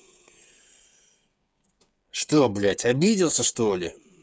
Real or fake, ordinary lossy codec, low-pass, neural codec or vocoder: fake; none; none; codec, 16 kHz, 8 kbps, FreqCodec, smaller model